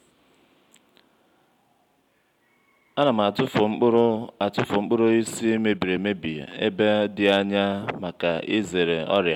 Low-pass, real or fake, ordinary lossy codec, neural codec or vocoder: 19.8 kHz; real; MP3, 96 kbps; none